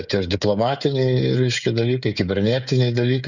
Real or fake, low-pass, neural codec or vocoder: fake; 7.2 kHz; codec, 16 kHz, 16 kbps, FreqCodec, smaller model